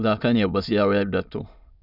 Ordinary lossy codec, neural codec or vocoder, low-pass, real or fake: none; autoencoder, 22.05 kHz, a latent of 192 numbers a frame, VITS, trained on many speakers; 5.4 kHz; fake